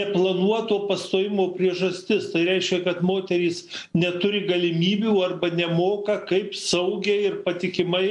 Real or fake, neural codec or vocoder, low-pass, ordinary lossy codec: real; none; 10.8 kHz; MP3, 64 kbps